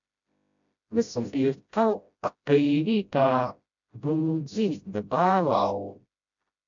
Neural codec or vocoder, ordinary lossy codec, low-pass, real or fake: codec, 16 kHz, 0.5 kbps, FreqCodec, smaller model; MP3, 64 kbps; 7.2 kHz; fake